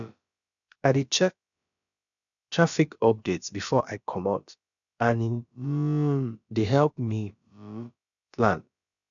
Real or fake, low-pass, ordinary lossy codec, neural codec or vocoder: fake; 7.2 kHz; none; codec, 16 kHz, about 1 kbps, DyCAST, with the encoder's durations